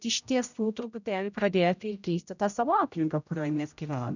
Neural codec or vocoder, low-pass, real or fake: codec, 16 kHz, 0.5 kbps, X-Codec, HuBERT features, trained on general audio; 7.2 kHz; fake